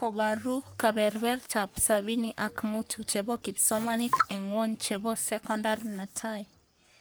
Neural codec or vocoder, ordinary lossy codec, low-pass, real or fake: codec, 44.1 kHz, 3.4 kbps, Pupu-Codec; none; none; fake